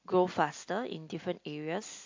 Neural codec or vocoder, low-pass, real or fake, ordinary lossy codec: none; 7.2 kHz; real; MP3, 48 kbps